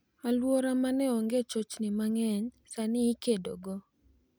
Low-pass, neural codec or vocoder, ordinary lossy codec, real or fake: none; none; none; real